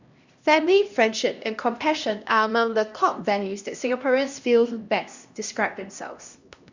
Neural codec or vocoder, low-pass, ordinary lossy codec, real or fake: codec, 16 kHz, 1 kbps, X-Codec, HuBERT features, trained on LibriSpeech; 7.2 kHz; Opus, 64 kbps; fake